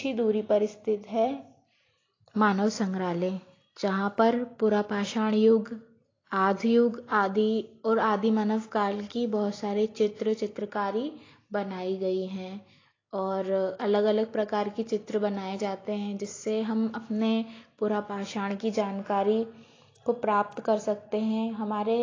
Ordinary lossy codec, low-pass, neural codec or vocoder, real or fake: AAC, 32 kbps; 7.2 kHz; none; real